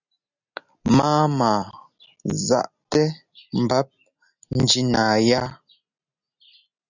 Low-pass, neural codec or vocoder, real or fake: 7.2 kHz; none; real